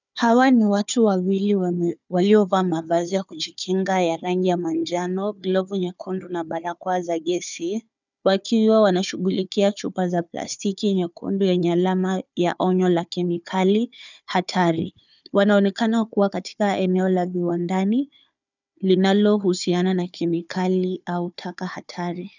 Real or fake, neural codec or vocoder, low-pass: fake; codec, 16 kHz, 4 kbps, FunCodec, trained on Chinese and English, 50 frames a second; 7.2 kHz